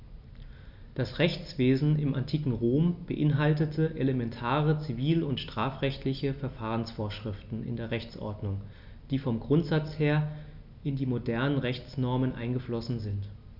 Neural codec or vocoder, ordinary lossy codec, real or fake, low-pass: none; none; real; 5.4 kHz